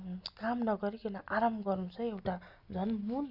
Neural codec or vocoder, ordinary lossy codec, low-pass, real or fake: none; none; 5.4 kHz; real